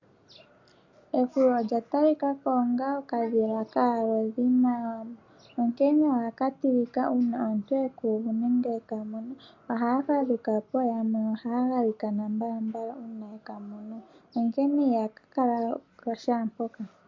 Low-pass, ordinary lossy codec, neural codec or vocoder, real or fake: 7.2 kHz; MP3, 48 kbps; none; real